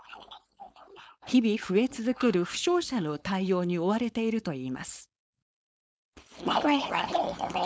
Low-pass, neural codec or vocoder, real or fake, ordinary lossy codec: none; codec, 16 kHz, 4.8 kbps, FACodec; fake; none